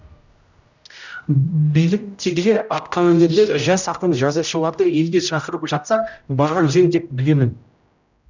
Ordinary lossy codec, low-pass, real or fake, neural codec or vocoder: none; 7.2 kHz; fake; codec, 16 kHz, 0.5 kbps, X-Codec, HuBERT features, trained on general audio